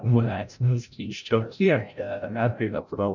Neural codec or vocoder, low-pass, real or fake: codec, 16 kHz, 0.5 kbps, FreqCodec, larger model; 7.2 kHz; fake